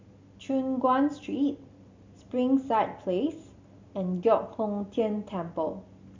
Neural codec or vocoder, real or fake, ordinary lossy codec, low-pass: none; real; MP3, 64 kbps; 7.2 kHz